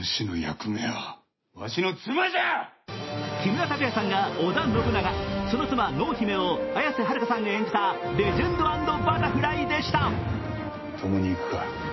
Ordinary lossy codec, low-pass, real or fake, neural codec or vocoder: MP3, 24 kbps; 7.2 kHz; real; none